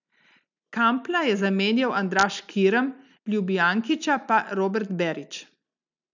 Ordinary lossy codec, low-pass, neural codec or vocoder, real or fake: none; 7.2 kHz; none; real